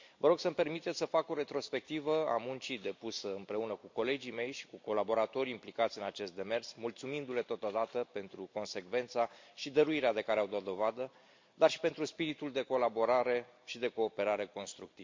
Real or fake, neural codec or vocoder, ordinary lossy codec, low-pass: real; none; MP3, 48 kbps; 7.2 kHz